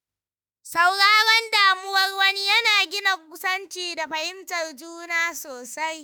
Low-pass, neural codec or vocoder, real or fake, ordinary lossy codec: none; autoencoder, 48 kHz, 32 numbers a frame, DAC-VAE, trained on Japanese speech; fake; none